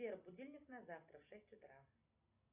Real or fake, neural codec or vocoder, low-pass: real; none; 3.6 kHz